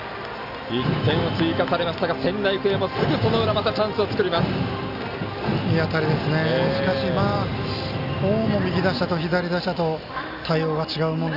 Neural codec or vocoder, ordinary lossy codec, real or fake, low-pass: none; none; real; 5.4 kHz